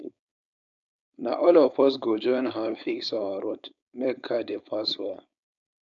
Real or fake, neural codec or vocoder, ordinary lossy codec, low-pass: fake; codec, 16 kHz, 4.8 kbps, FACodec; none; 7.2 kHz